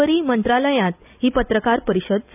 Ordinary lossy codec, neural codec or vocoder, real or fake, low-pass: none; none; real; 3.6 kHz